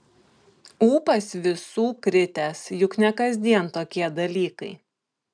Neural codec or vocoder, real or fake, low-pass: none; real; 9.9 kHz